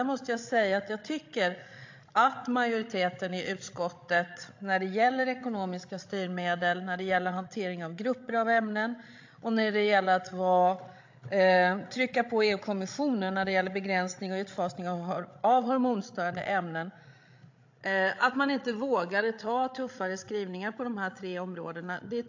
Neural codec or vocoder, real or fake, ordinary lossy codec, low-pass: codec, 16 kHz, 16 kbps, FreqCodec, larger model; fake; AAC, 48 kbps; 7.2 kHz